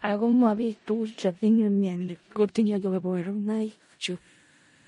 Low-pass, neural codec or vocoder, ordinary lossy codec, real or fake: 10.8 kHz; codec, 16 kHz in and 24 kHz out, 0.4 kbps, LongCat-Audio-Codec, four codebook decoder; MP3, 48 kbps; fake